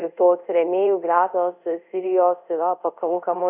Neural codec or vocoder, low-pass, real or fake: codec, 24 kHz, 0.5 kbps, DualCodec; 3.6 kHz; fake